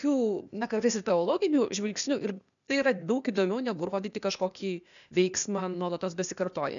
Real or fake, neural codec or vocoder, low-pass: fake; codec, 16 kHz, 0.8 kbps, ZipCodec; 7.2 kHz